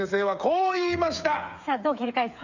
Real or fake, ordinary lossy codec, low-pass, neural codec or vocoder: fake; none; 7.2 kHz; codec, 16 kHz, 8 kbps, FreqCodec, smaller model